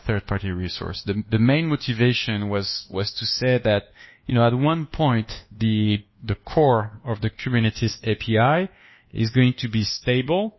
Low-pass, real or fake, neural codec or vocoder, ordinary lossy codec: 7.2 kHz; fake; codec, 24 kHz, 1.2 kbps, DualCodec; MP3, 24 kbps